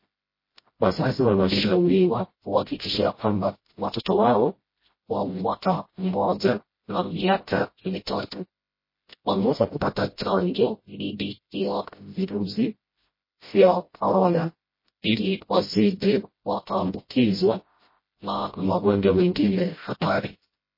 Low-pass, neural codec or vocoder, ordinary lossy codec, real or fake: 5.4 kHz; codec, 16 kHz, 0.5 kbps, FreqCodec, smaller model; MP3, 24 kbps; fake